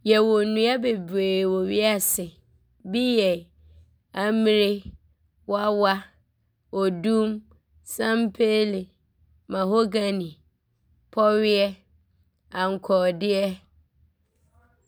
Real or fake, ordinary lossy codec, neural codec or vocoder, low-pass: real; none; none; none